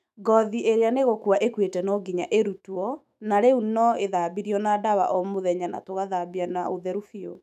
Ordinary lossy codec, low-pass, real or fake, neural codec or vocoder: none; 14.4 kHz; fake; autoencoder, 48 kHz, 128 numbers a frame, DAC-VAE, trained on Japanese speech